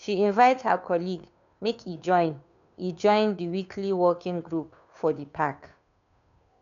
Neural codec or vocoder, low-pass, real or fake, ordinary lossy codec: codec, 16 kHz, 2 kbps, FunCodec, trained on Chinese and English, 25 frames a second; 7.2 kHz; fake; none